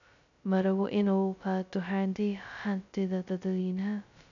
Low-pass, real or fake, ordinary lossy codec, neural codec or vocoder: 7.2 kHz; fake; none; codec, 16 kHz, 0.2 kbps, FocalCodec